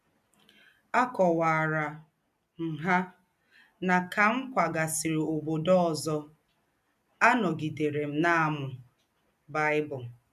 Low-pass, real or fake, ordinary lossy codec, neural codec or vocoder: 14.4 kHz; real; none; none